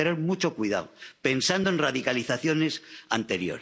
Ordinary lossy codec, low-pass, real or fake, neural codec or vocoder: none; none; real; none